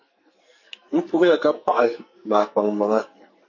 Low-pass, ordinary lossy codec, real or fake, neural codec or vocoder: 7.2 kHz; MP3, 32 kbps; fake; codec, 44.1 kHz, 2.6 kbps, SNAC